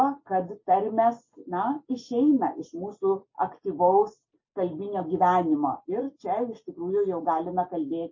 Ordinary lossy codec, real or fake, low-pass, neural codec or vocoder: MP3, 32 kbps; real; 7.2 kHz; none